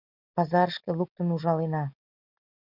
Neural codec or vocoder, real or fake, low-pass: none; real; 5.4 kHz